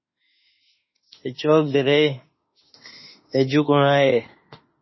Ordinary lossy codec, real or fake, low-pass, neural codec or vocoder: MP3, 24 kbps; fake; 7.2 kHz; autoencoder, 48 kHz, 32 numbers a frame, DAC-VAE, trained on Japanese speech